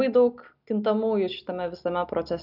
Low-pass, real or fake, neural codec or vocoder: 5.4 kHz; real; none